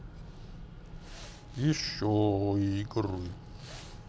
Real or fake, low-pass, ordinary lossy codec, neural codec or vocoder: real; none; none; none